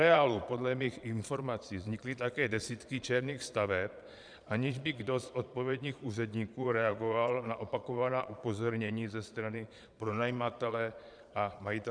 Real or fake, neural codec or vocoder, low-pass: fake; vocoder, 22.05 kHz, 80 mel bands, Vocos; 9.9 kHz